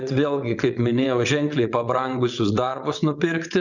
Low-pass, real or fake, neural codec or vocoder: 7.2 kHz; fake; vocoder, 22.05 kHz, 80 mel bands, WaveNeXt